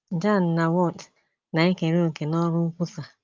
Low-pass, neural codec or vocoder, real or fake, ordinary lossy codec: 7.2 kHz; none; real; Opus, 32 kbps